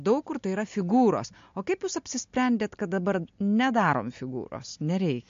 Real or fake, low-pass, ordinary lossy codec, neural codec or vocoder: real; 7.2 kHz; MP3, 48 kbps; none